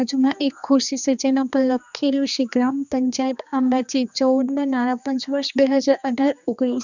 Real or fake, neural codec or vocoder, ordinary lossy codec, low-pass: fake; codec, 16 kHz, 2 kbps, X-Codec, HuBERT features, trained on general audio; none; 7.2 kHz